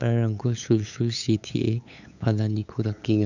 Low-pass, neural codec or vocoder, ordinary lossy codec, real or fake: 7.2 kHz; codec, 16 kHz, 8 kbps, FunCodec, trained on LibriTTS, 25 frames a second; none; fake